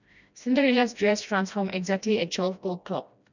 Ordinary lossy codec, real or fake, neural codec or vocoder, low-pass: none; fake; codec, 16 kHz, 1 kbps, FreqCodec, smaller model; 7.2 kHz